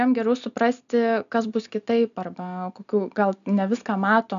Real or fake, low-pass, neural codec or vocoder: real; 7.2 kHz; none